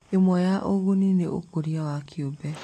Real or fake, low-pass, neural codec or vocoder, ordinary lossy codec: real; 14.4 kHz; none; AAC, 48 kbps